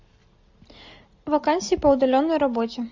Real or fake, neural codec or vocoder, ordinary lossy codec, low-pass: real; none; MP3, 48 kbps; 7.2 kHz